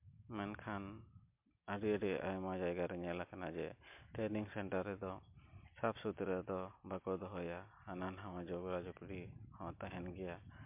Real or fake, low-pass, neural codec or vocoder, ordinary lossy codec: real; 3.6 kHz; none; MP3, 32 kbps